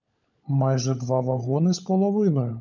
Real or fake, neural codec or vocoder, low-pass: fake; codec, 16 kHz, 16 kbps, FunCodec, trained on LibriTTS, 50 frames a second; 7.2 kHz